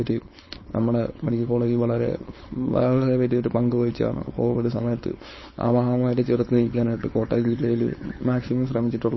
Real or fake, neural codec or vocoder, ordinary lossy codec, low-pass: fake; codec, 16 kHz, 4.8 kbps, FACodec; MP3, 24 kbps; 7.2 kHz